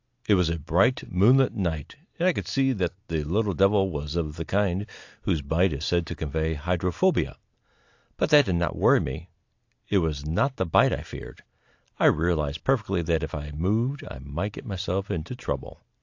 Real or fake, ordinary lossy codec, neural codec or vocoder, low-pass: real; AAC, 48 kbps; none; 7.2 kHz